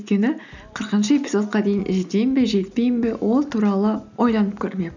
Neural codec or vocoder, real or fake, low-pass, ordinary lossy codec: none; real; 7.2 kHz; none